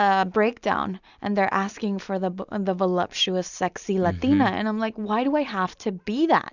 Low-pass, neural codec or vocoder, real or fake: 7.2 kHz; none; real